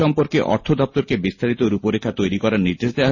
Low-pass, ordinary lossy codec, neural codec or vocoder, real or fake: 7.2 kHz; MP3, 32 kbps; none; real